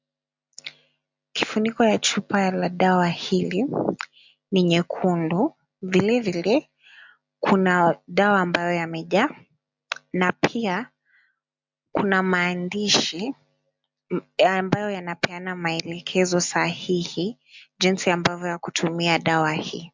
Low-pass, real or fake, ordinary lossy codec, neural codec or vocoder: 7.2 kHz; real; MP3, 64 kbps; none